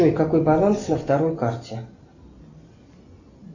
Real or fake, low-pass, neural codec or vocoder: real; 7.2 kHz; none